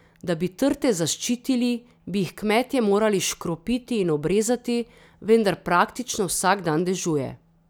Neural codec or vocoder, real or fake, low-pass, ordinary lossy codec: none; real; none; none